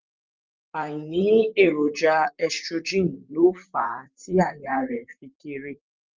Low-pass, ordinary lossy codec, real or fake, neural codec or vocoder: 7.2 kHz; Opus, 24 kbps; fake; vocoder, 44.1 kHz, 128 mel bands, Pupu-Vocoder